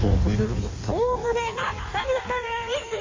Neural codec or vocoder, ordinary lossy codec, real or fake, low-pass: codec, 16 kHz in and 24 kHz out, 1.1 kbps, FireRedTTS-2 codec; MP3, 32 kbps; fake; 7.2 kHz